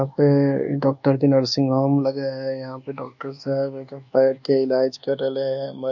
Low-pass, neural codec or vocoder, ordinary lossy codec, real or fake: 7.2 kHz; codec, 24 kHz, 1.2 kbps, DualCodec; none; fake